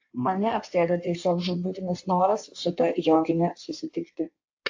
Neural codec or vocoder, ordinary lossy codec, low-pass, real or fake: codec, 16 kHz in and 24 kHz out, 1.1 kbps, FireRedTTS-2 codec; AAC, 48 kbps; 7.2 kHz; fake